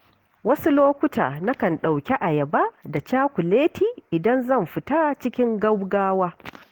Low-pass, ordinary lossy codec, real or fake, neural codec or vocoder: 19.8 kHz; Opus, 16 kbps; real; none